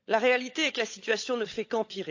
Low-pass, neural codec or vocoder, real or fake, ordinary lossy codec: 7.2 kHz; codec, 16 kHz, 16 kbps, FunCodec, trained on LibriTTS, 50 frames a second; fake; AAC, 48 kbps